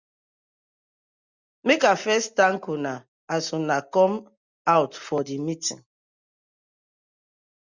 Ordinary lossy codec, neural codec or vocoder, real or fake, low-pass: Opus, 64 kbps; none; real; 7.2 kHz